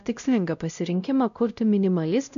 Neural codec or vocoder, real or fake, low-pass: codec, 16 kHz, 0.5 kbps, X-Codec, WavLM features, trained on Multilingual LibriSpeech; fake; 7.2 kHz